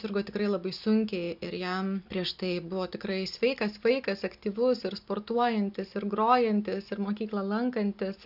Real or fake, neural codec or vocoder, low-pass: real; none; 5.4 kHz